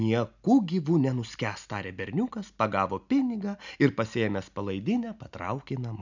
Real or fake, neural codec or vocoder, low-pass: real; none; 7.2 kHz